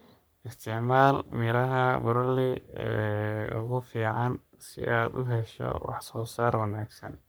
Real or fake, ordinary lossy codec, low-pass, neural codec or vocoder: fake; none; none; codec, 44.1 kHz, 3.4 kbps, Pupu-Codec